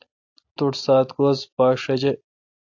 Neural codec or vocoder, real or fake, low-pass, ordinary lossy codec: none; real; 7.2 kHz; AAC, 48 kbps